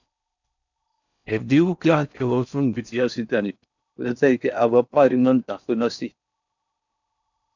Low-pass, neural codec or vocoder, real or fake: 7.2 kHz; codec, 16 kHz in and 24 kHz out, 0.6 kbps, FocalCodec, streaming, 4096 codes; fake